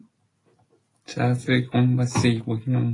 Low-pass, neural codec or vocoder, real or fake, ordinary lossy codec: 10.8 kHz; none; real; AAC, 32 kbps